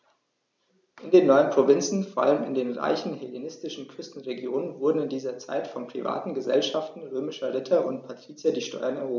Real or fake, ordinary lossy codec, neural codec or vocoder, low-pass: real; none; none; none